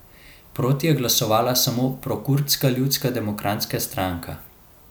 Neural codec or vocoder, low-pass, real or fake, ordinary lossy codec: none; none; real; none